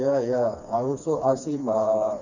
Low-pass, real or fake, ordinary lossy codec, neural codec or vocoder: 7.2 kHz; fake; none; codec, 16 kHz, 2 kbps, FreqCodec, smaller model